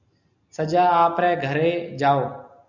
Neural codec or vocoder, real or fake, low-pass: none; real; 7.2 kHz